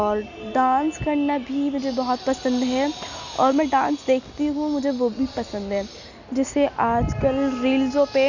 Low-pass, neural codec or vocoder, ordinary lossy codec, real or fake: 7.2 kHz; none; none; real